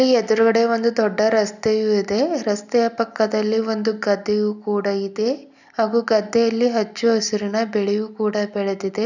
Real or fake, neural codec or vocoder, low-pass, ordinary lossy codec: real; none; 7.2 kHz; none